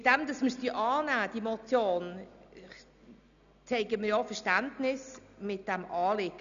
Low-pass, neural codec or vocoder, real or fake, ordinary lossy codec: 7.2 kHz; none; real; none